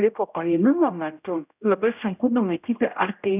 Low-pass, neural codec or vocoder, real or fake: 3.6 kHz; codec, 16 kHz, 0.5 kbps, X-Codec, HuBERT features, trained on general audio; fake